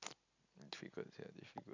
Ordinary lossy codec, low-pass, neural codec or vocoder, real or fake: none; 7.2 kHz; none; real